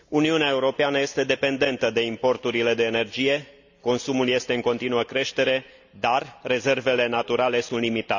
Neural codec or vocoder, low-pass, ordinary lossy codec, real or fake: none; 7.2 kHz; none; real